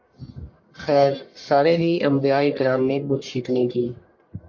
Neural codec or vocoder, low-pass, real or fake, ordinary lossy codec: codec, 44.1 kHz, 1.7 kbps, Pupu-Codec; 7.2 kHz; fake; MP3, 48 kbps